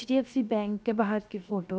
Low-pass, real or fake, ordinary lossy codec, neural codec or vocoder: none; fake; none; codec, 16 kHz, 0.7 kbps, FocalCodec